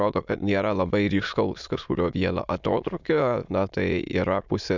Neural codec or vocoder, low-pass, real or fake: autoencoder, 22.05 kHz, a latent of 192 numbers a frame, VITS, trained on many speakers; 7.2 kHz; fake